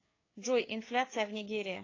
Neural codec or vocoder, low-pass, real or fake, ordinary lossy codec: codec, 16 kHz, 4 kbps, FreqCodec, larger model; 7.2 kHz; fake; AAC, 32 kbps